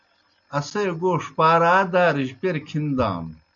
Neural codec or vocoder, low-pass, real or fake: none; 7.2 kHz; real